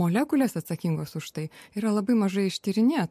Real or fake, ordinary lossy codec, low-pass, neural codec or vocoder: real; MP3, 64 kbps; 14.4 kHz; none